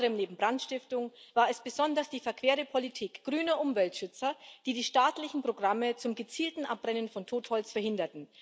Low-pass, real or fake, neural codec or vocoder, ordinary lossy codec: none; real; none; none